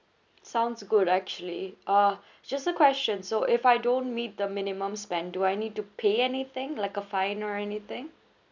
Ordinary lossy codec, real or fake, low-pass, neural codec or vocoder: none; real; 7.2 kHz; none